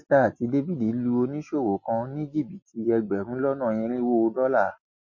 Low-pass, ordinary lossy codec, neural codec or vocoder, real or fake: 7.2 kHz; MP3, 32 kbps; none; real